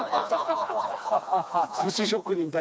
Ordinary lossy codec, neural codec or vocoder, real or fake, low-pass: none; codec, 16 kHz, 2 kbps, FreqCodec, smaller model; fake; none